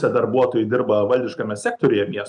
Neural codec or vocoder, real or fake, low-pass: none; real; 10.8 kHz